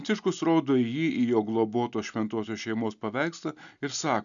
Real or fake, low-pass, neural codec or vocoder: real; 7.2 kHz; none